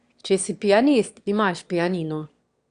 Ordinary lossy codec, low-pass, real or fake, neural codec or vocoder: Opus, 64 kbps; 9.9 kHz; fake; autoencoder, 22.05 kHz, a latent of 192 numbers a frame, VITS, trained on one speaker